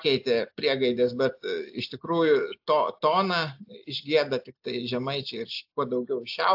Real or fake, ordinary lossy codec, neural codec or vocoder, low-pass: real; AAC, 48 kbps; none; 5.4 kHz